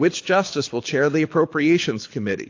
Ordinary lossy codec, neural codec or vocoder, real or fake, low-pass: AAC, 48 kbps; codec, 24 kHz, 6 kbps, HILCodec; fake; 7.2 kHz